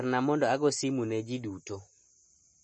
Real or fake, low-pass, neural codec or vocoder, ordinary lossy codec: real; 10.8 kHz; none; MP3, 32 kbps